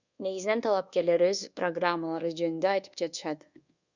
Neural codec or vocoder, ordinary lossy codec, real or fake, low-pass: codec, 24 kHz, 1.2 kbps, DualCodec; Opus, 64 kbps; fake; 7.2 kHz